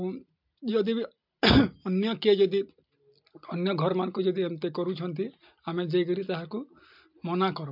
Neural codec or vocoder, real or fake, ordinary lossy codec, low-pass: none; real; MP3, 48 kbps; 5.4 kHz